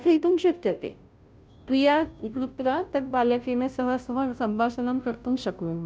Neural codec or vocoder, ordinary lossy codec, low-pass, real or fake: codec, 16 kHz, 0.5 kbps, FunCodec, trained on Chinese and English, 25 frames a second; none; none; fake